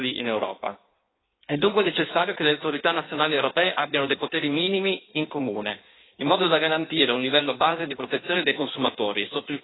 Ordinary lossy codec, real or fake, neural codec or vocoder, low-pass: AAC, 16 kbps; fake; codec, 16 kHz in and 24 kHz out, 1.1 kbps, FireRedTTS-2 codec; 7.2 kHz